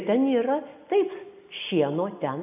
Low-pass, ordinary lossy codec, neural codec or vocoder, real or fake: 3.6 kHz; AAC, 32 kbps; none; real